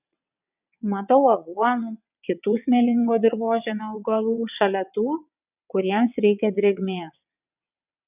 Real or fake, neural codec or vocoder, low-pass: fake; vocoder, 22.05 kHz, 80 mel bands, WaveNeXt; 3.6 kHz